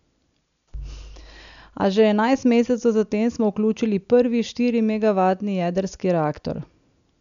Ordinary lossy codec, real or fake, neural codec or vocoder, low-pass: none; real; none; 7.2 kHz